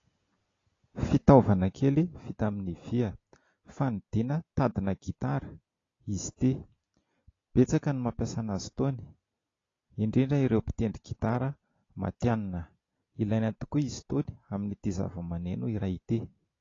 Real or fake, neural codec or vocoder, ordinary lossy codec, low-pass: real; none; AAC, 32 kbps; 7.2 kHz